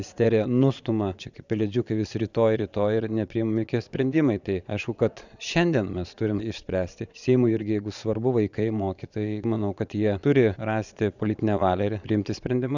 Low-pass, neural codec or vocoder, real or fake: 7.2 kHz; vocoder, 22.05 kHz, 80 mel bands, Vocos; fake